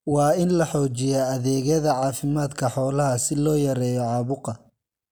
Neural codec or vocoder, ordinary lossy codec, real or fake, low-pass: none; none; real; none